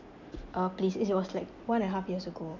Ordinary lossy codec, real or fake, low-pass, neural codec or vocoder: none; real; 7.2 kHz; none